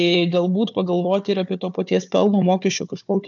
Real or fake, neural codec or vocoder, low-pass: fake; codec, 16 kHz, 4 kbps, FunCodec, trained on Chinese and English, 50 frames a second; 7.2 kHz